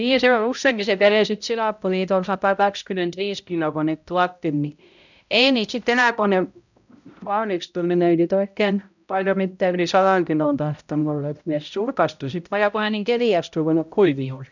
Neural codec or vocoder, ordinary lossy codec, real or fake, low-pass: codec, 16 kHz, 0.5 kbps, X-Codec, HuBERT features, trained on balanced general audio; none; fake; 7.2 kHz